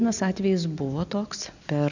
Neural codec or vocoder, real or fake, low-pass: none; real; 7.2 kHz